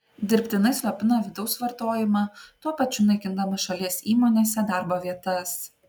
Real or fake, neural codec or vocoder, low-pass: real; none; 19.8 kHz